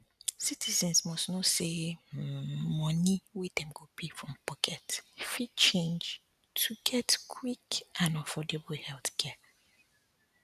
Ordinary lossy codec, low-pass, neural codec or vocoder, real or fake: none; 14.4 kHz; none; real